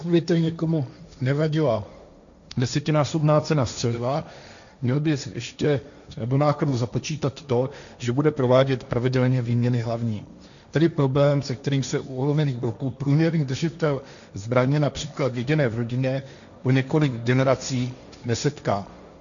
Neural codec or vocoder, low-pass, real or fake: codec, 16 kHz, 1.1 kbps, Voila-Tokenizer; 7.2 kHz; fake